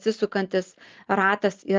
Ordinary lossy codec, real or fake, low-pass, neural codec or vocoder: Opus, 32 kbps; real; 7.2 kHz; none